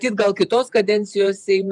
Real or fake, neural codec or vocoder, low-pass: fake; vocoder, 48 kHz, 128 mel bands, Vocos; 10.8 kHz